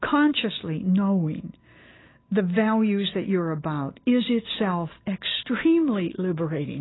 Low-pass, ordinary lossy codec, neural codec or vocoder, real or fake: 7.2 kHz; AAC, 16 kbps; none; real